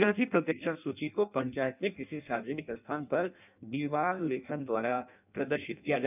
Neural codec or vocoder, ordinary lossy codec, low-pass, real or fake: codec, 16 kHz in and 24 kHz out, 0.6 kbps, FireRedTTS-2 codec; none; 3.6 kHz; fake